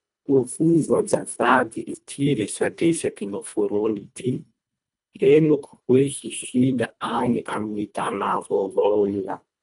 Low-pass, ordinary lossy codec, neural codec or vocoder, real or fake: 10.8 kHz; none; codec, 24 kHz, 1.5 kbps, HILCodec; fake